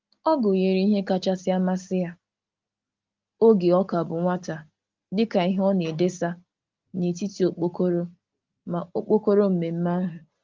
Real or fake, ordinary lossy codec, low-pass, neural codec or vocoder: real; Opus, 32 kbps; 7.2 kHz; none